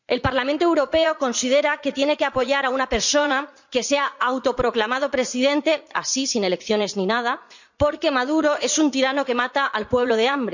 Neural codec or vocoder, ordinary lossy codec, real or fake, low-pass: vocoder, 44.1 kHz, 80 mel bands, Vocos; none; fake; 7.2 kHz